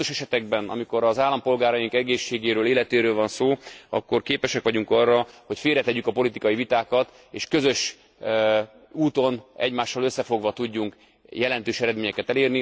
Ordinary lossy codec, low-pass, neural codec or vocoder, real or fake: none; none; none; real